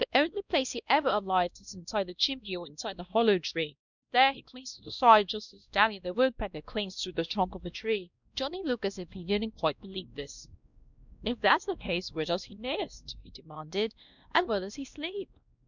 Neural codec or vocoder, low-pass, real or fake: codec, 16 kHz, 1 kbps, X-Codec, WavLM features, trained on Multilingual LibriSpeech; 7.2 kHz; fake